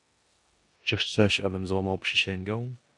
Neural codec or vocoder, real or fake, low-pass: codec, 16 kHz in and 24 kHz out, 0.9 kbps, LongCat-Audio-Codec, four codebook decoder; fake; 10.8 kHz